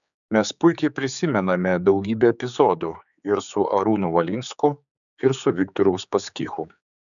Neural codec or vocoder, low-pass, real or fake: codec, 16 kHz, 4 kbps, X-Codec, HuBERT features, trained on general audio; 7.2 kHz; fake